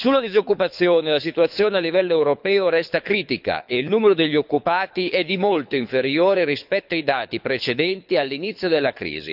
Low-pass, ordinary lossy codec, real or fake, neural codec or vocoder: 5.4 kHz; none; fake; codec, 24 kHz, 6 kbps, HILCodec